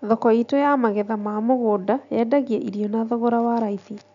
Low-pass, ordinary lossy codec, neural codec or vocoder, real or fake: 7.2 kHz; none; none; real